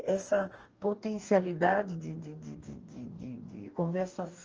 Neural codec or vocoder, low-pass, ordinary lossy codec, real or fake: codec, 44.1 kHz, 2.6 kbps, DAC; 7.2 kHz; Opus, 24 kbps; fake